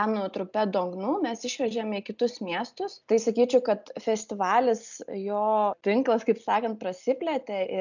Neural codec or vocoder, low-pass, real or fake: none; 7.2 kHz; real